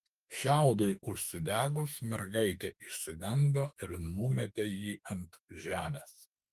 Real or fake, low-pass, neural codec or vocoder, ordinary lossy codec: fake; 14.4 kHz; autoencoder, 48 kHz, 32 numbers a frame, DAC-VAE, trained on Japanese speech; Opus, 32 kbps